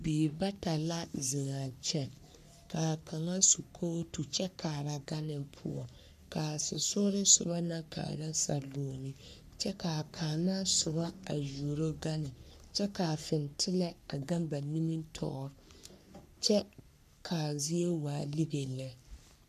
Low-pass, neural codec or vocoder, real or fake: 14.4 kHz; codec, 44.1 kHz, 3.4 kbps, Pupu-Codec; fake